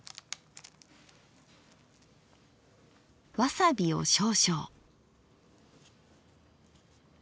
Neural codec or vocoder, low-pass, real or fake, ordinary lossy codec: none; none; real; none